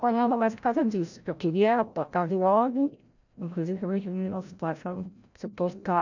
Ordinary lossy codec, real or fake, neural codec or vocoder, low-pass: none; fake; codec, 16 kHz, 0.5 kbps, FreqCodec, larger model; 7.2 kHz